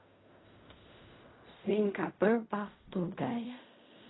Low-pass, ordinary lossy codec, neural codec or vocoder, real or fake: 7.2 kHz; AAC, 16 kbps; codec, 16 kHz in and 24 kHz out, 0.4 kbps, LongCat-Audio-Codec, fine tuned four codebook decoder; fake